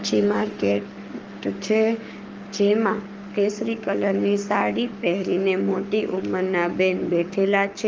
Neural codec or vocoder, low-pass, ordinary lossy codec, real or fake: codec, 44.1 kHz, 7.8 kbps, Pupu-Codec; 7.2 kHz; Opus, 24 kbps; fake